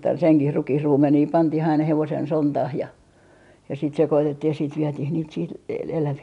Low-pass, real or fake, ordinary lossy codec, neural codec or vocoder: 10.8 kHz; real; none; none